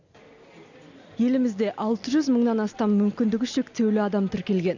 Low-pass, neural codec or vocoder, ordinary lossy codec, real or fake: 7.2 kHz; none; none; real